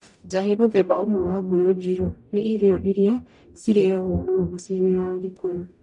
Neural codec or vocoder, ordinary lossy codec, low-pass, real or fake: codec, 44.1 kHz, 0.9 kbps, DAC; MP3, 96 kbps; 10.8 kHz; fake